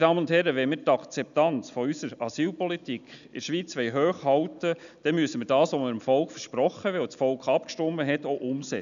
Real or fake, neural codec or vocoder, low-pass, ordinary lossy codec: real; none; 7.2 kHz; none